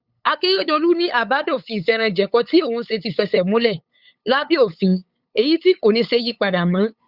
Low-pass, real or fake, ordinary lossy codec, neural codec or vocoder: 5.4 kHz; fake; Opus, 64 kbps; codec, 16 kHz, 8 kbps, FunCodec, trained on LibriTTS, 25 frames a second